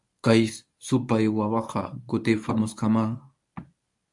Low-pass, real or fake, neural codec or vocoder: 10.8 kHz; fake; codec, 24 kHz, 0.9 kbps, WavTokenizer, medium speech release version 1